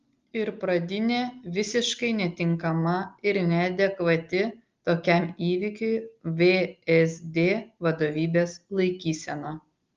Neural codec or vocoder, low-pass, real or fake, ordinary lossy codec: none; 7.2 kHz; real; Opus, 32 kbps